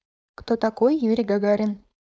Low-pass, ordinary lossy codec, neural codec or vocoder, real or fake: none; none; codec, 16 kHz, 4.8 kbps, FACodec; fake